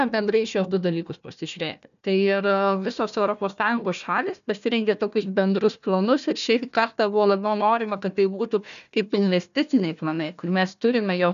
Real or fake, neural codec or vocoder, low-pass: fake; codec, 16 kHz, 1 kbps, FunCodec, trained on Chinese and English, 50 frames a second; 7.2 kHz